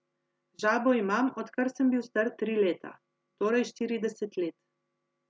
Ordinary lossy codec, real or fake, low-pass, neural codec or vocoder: none; real; none; none